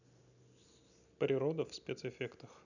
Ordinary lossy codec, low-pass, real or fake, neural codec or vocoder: none; 7.2 kHz; real; none